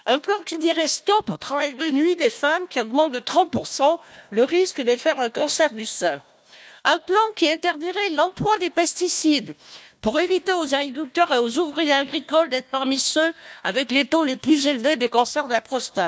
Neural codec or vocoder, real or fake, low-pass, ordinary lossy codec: codec, 16 kHz, 1 kbps, FunCodec, trained on Chinese and English, 50 frames a second; fake; none; none